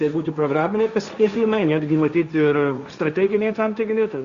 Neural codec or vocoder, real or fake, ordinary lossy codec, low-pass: codec, 16 kHz, 1.1 kbps, Voila-Tokenizer; fake; Opus, 64 kbps; 7.2 kHz